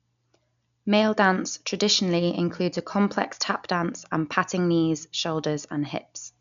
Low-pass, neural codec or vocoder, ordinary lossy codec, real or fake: 7.2 kHz; none; none; real